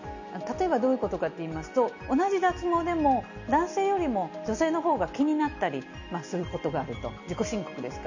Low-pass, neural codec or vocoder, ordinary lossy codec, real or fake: 7.2 kHz; none; none; real